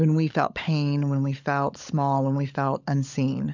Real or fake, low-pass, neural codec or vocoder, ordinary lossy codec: fake; 7.2 kHz; codec, 16 kHz, 16 kbps, FunCodec, trained on LibriTTS, 50 frames a second; MP3, 64 kbps